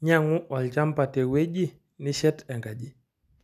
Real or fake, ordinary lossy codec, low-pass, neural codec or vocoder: real; none; 14.4 kHz; none